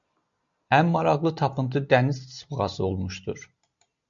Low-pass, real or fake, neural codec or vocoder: 7.2 kHz; real; none